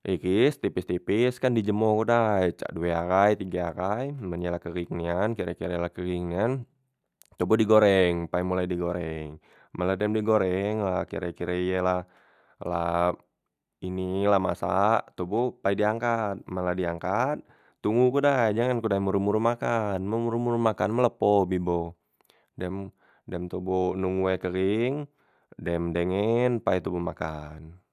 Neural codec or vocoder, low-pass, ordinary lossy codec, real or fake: none; 14.4 kHz; none; real